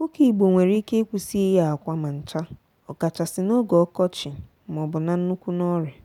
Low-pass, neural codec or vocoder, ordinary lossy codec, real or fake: 19.8 kHz; none; none; real